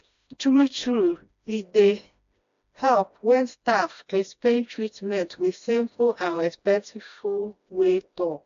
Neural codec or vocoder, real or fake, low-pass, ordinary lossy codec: codec, 16 kHz, 1 kbps, FreqCodec, smaller model; fake; 7.2 kHz; AAC, 64 kbps